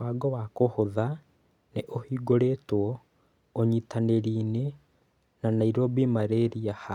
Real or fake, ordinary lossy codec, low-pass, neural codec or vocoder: real; none; 19.8 kHz; none